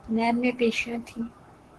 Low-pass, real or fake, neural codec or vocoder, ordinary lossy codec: 10.8 kHz; fake; codec, 44.1 kHz, 7.8 kbps, Pupu-Codec; Opus, 16 kbps